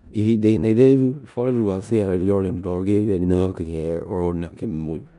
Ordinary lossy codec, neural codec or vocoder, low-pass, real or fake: none; codec, 16 kHz in and 24 kHz out, 0.4 kbps, LongCat-Audio-Codec, four codebook decoder; 10.8 kHz; fake